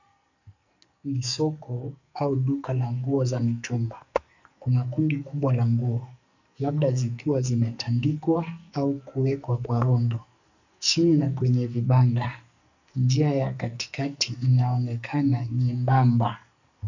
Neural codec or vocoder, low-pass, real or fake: codec, 44.1 kHz, 2.6 kbps, SNAC; 7.2 kHz; fake